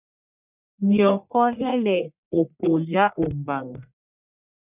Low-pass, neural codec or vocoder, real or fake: 3.6 kHz; codec, 44.1 kHz, 1.7 kbps, Pupu-Codec; fake